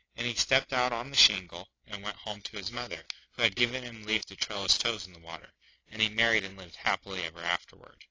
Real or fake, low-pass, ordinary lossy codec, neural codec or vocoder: real; 7.2 kHz; AAC, 32 kbps; none